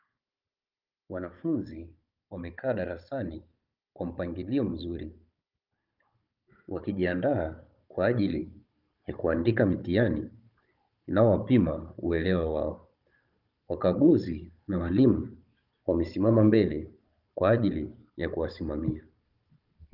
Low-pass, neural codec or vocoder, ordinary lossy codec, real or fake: 5.4 kHz; codec, 16 kHz, 16 kbps, FunCodec, trained on Chinese and English, 50 frames a second; Opus, 24 kbps; fake